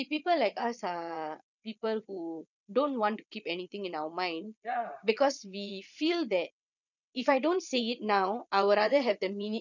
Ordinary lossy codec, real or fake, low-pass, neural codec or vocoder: none; fake; 7.2 kHz; vocoder, 22.05 kHz, 80 mel bands, Vocos